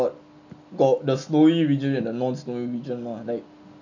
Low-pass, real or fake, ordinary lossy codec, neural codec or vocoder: 7.2 kHz; real; none; none